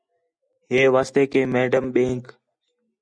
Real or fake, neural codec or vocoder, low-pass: real; none; 9.9 kHz